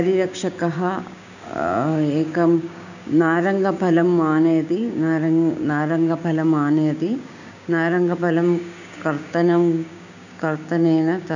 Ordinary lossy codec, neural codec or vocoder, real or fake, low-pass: none; codec, 16 kHz, 6 kbps, DAC; fake; 7.2 kHz